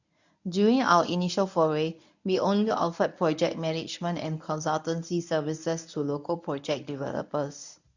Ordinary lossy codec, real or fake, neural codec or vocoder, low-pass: none; fake; codec, 24 kHz, 0.9 kbps, WavTokenizer, medium speech release version 1; 7.2 kHz